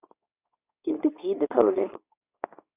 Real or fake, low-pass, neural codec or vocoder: fake; 3.6 kHz; codec, 24 kHz, 0.9 kbps, WavTokenizer, medium speech release version 2